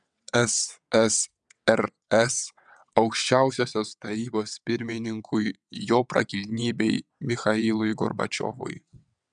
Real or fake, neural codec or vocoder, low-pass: fake; vocoder, 22.05 kHz, 80 mel bands, WaveNeXt; 9.9 kHz